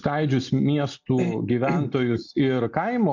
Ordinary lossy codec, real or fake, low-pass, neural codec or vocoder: MP3, 64 kbps; real; 7.2 kHz; none